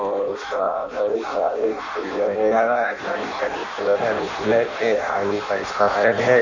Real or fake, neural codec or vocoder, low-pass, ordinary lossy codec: fake; codec, 16 kHz in and 24 kHz out, 0.6 kbps, FireRedTTS-2 codec; 7.2 kHz; none